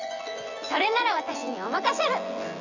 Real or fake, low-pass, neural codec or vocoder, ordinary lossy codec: fake; 7.2 kHz; vocoder, 24 kHz, 100 mel bands, Vocos; none